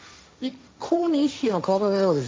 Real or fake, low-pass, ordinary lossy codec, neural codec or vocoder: fake; none; none; codec, 16 kHz, 1.1 kbps, Voila-Tokenizer